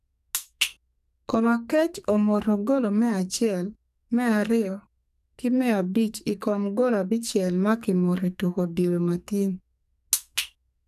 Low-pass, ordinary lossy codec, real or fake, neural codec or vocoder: 14.4 kHz; none; fake; codec, 44.1 kHz, 2.6 kbps, SNAC